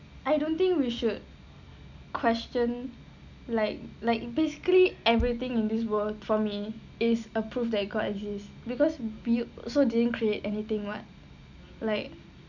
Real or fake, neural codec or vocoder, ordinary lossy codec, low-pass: real; none; none; 7.2 kHz